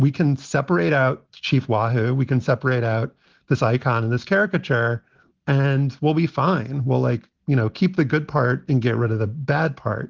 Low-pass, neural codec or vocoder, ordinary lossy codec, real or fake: 7.2 kHz; none; Opus, 16 kbps; real